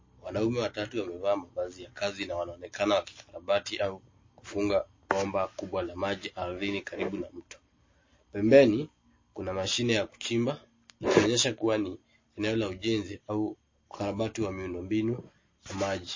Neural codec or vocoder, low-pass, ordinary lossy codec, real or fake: none; 7.2 kHz; MP3, 32 kbps; real